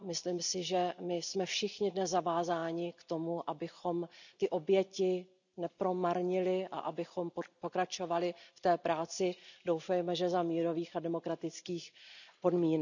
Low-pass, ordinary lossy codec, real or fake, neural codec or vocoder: 7.2 kHz; none; real; none